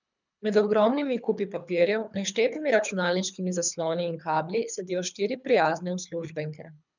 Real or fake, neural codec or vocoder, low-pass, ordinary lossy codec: fake; codec, 24 kHz, 3 kbps, HILCodec; 7.2 kHz; none